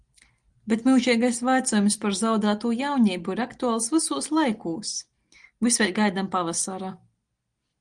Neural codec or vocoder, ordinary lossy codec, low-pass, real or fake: none; Opus, 24 kbps; 9.9 kHz; real